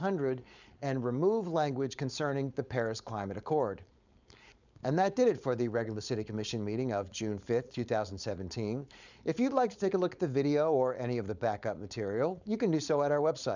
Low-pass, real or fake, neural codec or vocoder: 7.2 kHz; fake; codec, 16 kHz, 4.8 kbps, FACodec